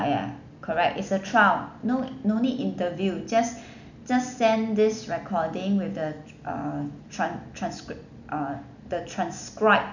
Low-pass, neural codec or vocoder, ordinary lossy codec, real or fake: 7.2 kHz; none; none; real